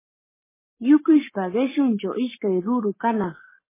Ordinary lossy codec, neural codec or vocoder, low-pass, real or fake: MP3, 16 kbps; codec, 44.1 kHz, 7.8 kbps, Pupu-Codec; 3.6 kHz; fake